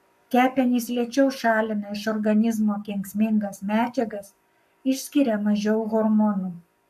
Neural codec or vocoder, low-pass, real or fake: codec, 44.1 kHz, 7.8 kbps, Pupu-Codec; 14.4 kHz; fake